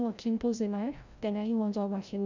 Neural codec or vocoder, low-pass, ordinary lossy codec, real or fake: codec, 16 kHz, 0.5 kbps, FreqCodec, larger model; 7.2 kHz; none; fake